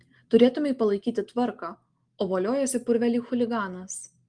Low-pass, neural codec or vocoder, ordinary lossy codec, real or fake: 9.9 kHz; none; Opus, 24 kbps; real